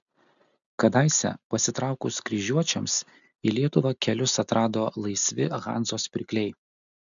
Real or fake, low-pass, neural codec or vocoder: real; 7.2 kHz; none